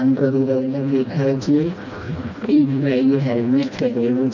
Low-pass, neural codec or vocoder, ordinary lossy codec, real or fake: 7.2 kHz; codec, 16 kHz, 1 kbps, FreqCodec, smaller model; none; fake